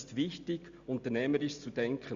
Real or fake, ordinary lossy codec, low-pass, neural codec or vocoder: real; Opus, 64 kbps; 7.2 kHz; none